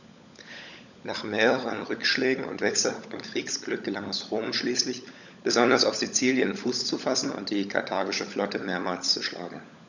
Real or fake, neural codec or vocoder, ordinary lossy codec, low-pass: fake; codec, 16 kHz, 16 kbps, FunCodec, trained on LibriTTS, 50 frames a second; none; 7.2 kHz